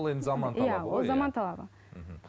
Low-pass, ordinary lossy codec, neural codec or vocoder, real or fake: none; none; none; real